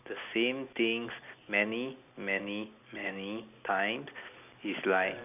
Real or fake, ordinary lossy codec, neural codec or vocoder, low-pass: real; none; none; 3.6 kHz